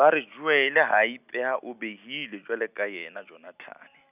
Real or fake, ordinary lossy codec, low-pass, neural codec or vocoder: real; none; 3.6 kHz; none